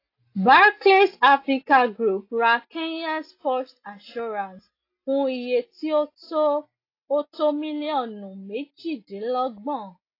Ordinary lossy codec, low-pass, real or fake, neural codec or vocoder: AAC, 32 kbps; 5.4 kHz; real; none